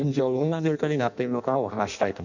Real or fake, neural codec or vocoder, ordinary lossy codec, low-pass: fake; codec, 16 kHz in and 24 kHz out, 0.6 kbps, FireRedTTS-2 codec; none; 7.2 kHz